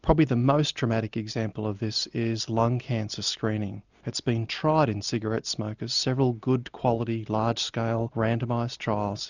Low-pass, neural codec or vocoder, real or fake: 7.2 kHz; none; real